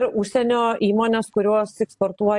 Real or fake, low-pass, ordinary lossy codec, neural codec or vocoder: real; 10.8 kHz; AAC, 64 kbps; none